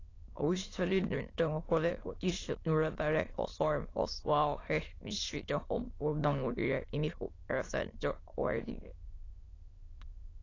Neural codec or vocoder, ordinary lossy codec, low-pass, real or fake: autoencoder, 22.05 kHz, a latent of 192 numbers a frame, VITS, trained on many speakers; AAC, 32 kbps; 7.2 kHz; fake